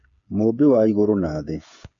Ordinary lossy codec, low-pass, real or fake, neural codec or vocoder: AAC, 64 kbps; 7.2 kHz; fake; codec, 16 kHz, 8 kbps, FreqCodec, smaller model